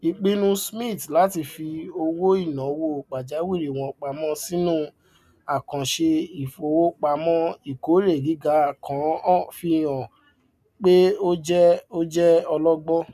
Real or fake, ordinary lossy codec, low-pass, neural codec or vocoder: real; none; 14.4 kHz; none